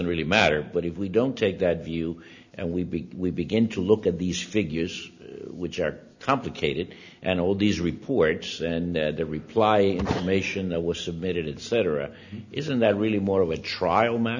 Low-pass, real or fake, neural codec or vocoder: 7.2 kHz; real; none